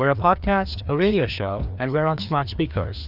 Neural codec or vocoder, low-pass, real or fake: codec, 16 kHz, 2 kbps, FreqCodec, larger model; 5.4 kHz; fake